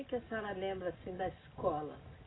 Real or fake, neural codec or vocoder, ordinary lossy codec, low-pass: real; none; AAC, 16 kbps; 7.2 kHz